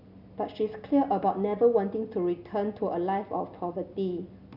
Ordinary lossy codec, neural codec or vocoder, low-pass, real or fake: none; none; 5.4 kHz; real